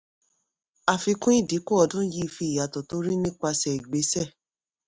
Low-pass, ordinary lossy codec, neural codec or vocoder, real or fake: none; none; none; real